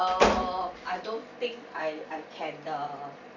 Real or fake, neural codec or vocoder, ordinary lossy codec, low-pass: real; none; none; 7.2 kHz